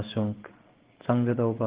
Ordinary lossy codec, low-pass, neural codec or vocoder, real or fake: Opus, 16 kbps; 3.6 kHz; codec, 16 kHz in and 24 kHz out, 1 kbps, XY-Tokenizer; fake